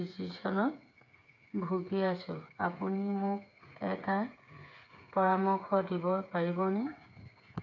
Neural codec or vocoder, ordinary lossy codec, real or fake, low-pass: codec, 16 kHz, 8 kbps, FreqCodec, smaller model; none; fake; 7.2 kHz